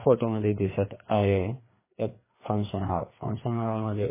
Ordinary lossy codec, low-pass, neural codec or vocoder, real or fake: MP3, 16 kbps; 3.6 kHz; codec, 16 kHz, 2 kbps, FreqCodec, larger model; fake